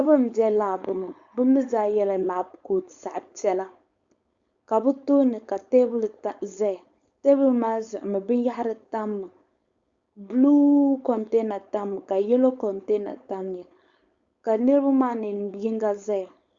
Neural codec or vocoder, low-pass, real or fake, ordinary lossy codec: codec, 16 kHz, 4.8 kbps, FACodec; 7.2 kHz; fake; Opus, 64 kbps